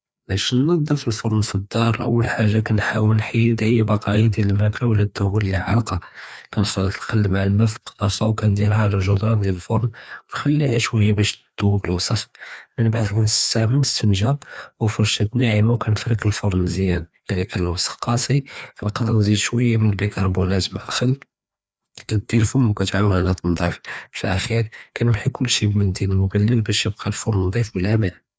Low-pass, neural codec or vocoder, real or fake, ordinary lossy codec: none; codec, 16 kHz, 2 kbps, FreqCodec, larger model; fake; none